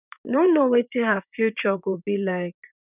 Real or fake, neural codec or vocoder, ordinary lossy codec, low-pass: fake; vocoder, 44.1 kHz, 128 mel bands, Pupu-Vocoder; none; 3.6 kHz